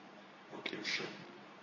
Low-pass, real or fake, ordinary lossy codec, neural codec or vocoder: 7.2 kHz; fake; MP3, 32 kbps; codec, 24 kHz, 0.9 kbps, WavTokenizer, medium speech release version 2